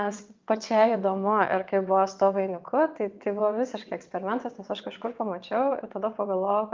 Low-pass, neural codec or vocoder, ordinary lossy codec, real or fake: 7.2 kHz; vocoder, 22.05 kHz, 80 mel bands, WaveNeXt; Opus, 24 kbps; fake